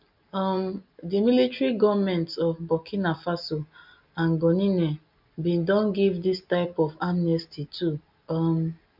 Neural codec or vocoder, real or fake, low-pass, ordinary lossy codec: none; real; 5.4 kHz; MP3, 48 kbps